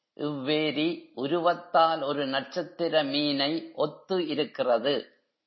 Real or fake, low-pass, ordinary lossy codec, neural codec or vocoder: real; 7.2 kHz; MP3, 24 kbps; none